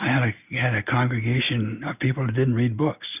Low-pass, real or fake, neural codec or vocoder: 3.6 kHz; real; none